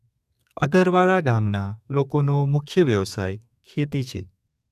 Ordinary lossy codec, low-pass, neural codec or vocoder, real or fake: none; 14.4 kHz; codec, 32 kHz, 1.9 kbps, SNAC; fake